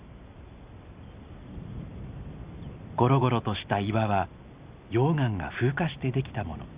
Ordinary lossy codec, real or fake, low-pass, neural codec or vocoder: Opus, 64 kbps; real; 3.6 kHz; none